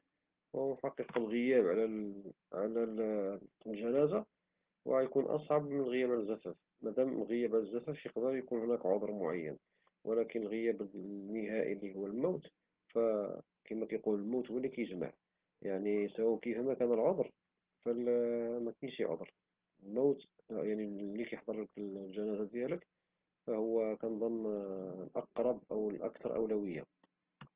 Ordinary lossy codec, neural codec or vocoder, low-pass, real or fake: Opus, 16 kbps; none; 3.6 kHz; real